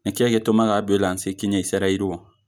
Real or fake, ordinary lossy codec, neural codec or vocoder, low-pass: real; none; none; none